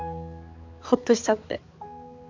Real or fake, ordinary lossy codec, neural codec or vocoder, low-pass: fake; MP3, 64 kbps; codec, 16 kHz, 4 kbps, X-Codec, HuBERT features, trained on balanced general audio; 7.2 kHz